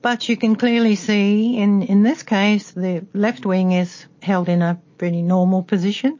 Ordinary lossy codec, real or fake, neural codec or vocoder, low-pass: MP3, 32 kbps; real; none; 7.2 kHz